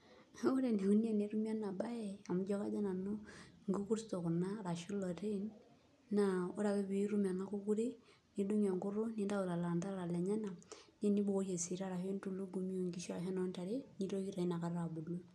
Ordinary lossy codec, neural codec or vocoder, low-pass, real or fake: none; none; none; real